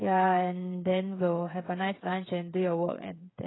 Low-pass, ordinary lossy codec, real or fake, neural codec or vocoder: 7.2 kHz; AAC, 16 kbps; fake; codec, 16 kHz, 8 kbps, FreqCodec, smaller model